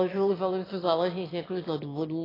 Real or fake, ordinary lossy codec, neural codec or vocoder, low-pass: fake; AAC, 24 kbps; autoencoder, 22.05 kHz, a latent of 192 numbers a frame, VITS, trained on one speaker; 5.4 kHz